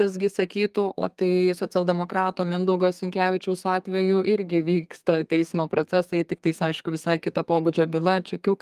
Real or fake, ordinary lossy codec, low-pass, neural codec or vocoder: fake; Opus, 32 kbps; 14.4 kHz; codec, 32 kHz, 1.9 kbps, SNAC